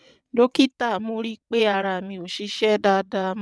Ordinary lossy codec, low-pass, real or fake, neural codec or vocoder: none; none; fake; vocoder, 22.05 kHz, 80 mel bands, WaveNeXt